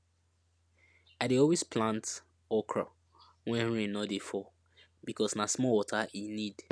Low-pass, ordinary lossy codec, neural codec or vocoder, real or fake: none; none; none; real